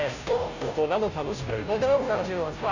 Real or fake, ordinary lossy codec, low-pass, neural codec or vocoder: fake; none; 7.2 kHz; codec, 16 kHz, 0.5 kbps, FunCodec, trained on Chinese and English, 25 frames a second